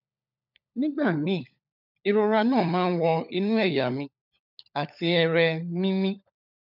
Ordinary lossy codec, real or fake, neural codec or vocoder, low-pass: none; fake; codec, 16 kHz, 16 kbps, FunCodec, trained on LibriTTS, 50 frames a second; 5.4 kHz